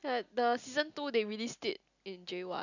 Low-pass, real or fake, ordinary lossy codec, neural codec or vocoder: 7.2 kHz; real; none; none